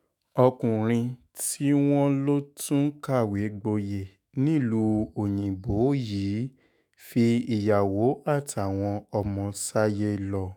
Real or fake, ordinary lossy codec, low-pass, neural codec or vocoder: fake; none; none; autoencoder, 48 kHz, 128 numbers a frame, DAC-VAE, trained on Japanese speech